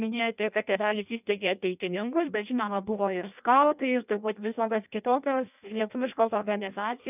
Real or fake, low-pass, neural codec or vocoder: fake; 3.6 kHz; codec, 16 kHz in and 24 kHz out, 0.6 kbps, FireRedTTS-2 codec